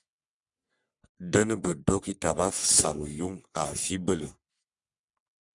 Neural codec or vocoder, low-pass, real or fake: codec, 44.1 kHz, 3.4 kbps, Pupu-Codec; 10.8 kHz; fake